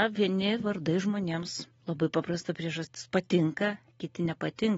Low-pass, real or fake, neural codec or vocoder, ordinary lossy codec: 19.8 kHz; real; none; AAC, 24 kbps